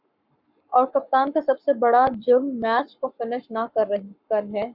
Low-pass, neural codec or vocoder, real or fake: 5.4 kHz; codec, 44.1 kHz, 7.8 kbps, Pupu-Codec; fake